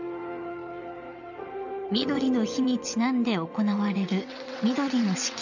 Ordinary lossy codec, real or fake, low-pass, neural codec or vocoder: none; fake; 7.2 kHz; vocoder, 22.05 kHz, 80 mel bands, WaveNeXt